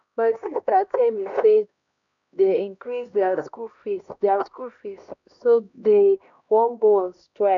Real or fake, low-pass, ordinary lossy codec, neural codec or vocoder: fake; 7.2 kHz; none; codec, 16 kHz, 1 kbps, X-Codec, HuBERT features, trained on LibriSpeech